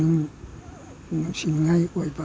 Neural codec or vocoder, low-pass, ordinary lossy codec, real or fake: none; none; none; real